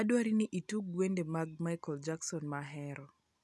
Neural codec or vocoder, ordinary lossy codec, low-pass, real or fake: none; none; none; real